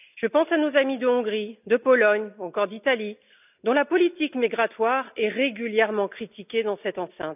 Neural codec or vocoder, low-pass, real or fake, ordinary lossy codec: none; 3.6 kHz; real; none